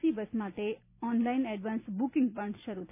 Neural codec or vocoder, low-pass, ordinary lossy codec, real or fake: none; 3.6 kHz; none; real